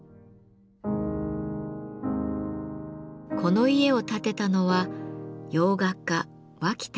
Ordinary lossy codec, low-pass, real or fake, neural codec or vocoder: none; none; real; none